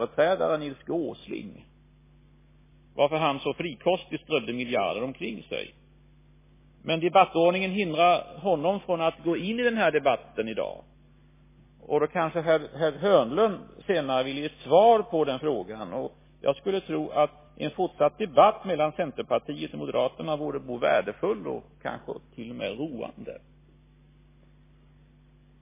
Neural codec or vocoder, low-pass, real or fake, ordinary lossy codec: none; 3.6 kHz; real; MP3, 16 kbps